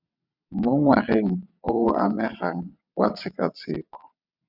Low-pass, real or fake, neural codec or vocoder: 5.4 kHz; fake; vocoder, 22.05 kHz, 80 mel bands, WaveNeXt